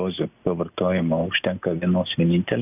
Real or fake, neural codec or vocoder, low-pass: real; none; 3.6 kHz